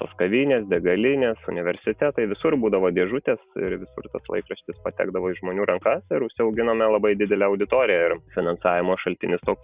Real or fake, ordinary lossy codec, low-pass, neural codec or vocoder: real; Opus, 64 kbps; 3.6 kHz; none